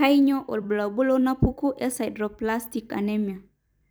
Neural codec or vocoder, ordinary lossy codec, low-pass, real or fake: vocoder, 44.1 kHz, 128 mel bands every 256 samples, BigVGAN v2; none; none; fake